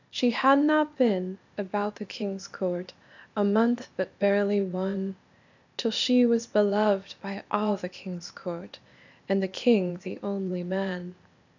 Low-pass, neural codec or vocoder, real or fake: 7.2 kHz; codec, 16 kHz, 0.8 kbps, ZipCodec; fake